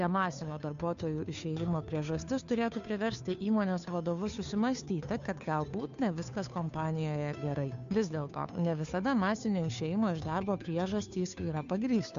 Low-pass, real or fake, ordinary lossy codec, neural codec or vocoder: 7.2 kHz; fake; AAC, 96 kbps; codec, 16 kHz, 2 kbps, FunCodec, trained on Chinese and English, 25 frames a second